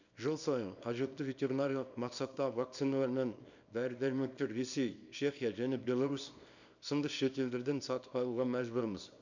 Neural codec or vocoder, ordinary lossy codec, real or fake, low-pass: codec, 24 kHz, 0.9 kbps, WavTokenizer, small release; none; fake; 7.2 kHz